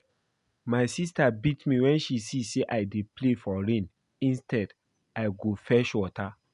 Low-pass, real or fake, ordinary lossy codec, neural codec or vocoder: 10.8 kHz; real; none; none